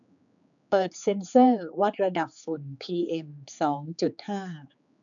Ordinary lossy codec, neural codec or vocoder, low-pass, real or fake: none; codec, 16 kHz, 4 kbps, X-Codec, HuBERT features, trained on general audio; 7.2 kHz; fake